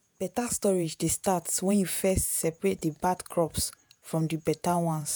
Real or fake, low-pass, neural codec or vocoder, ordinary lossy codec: fake; none; vocoder, 48 kHz, 128 mel bands, Vocos; none